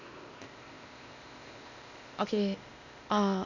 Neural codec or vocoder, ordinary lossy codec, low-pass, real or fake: codec, 16 kHz, 0.8 kbps, ZipCodec; none; 7.2 kHz; fake